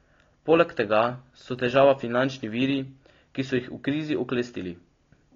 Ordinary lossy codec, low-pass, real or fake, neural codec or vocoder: AAC, 32 kbps; 7.2 kHz; real; none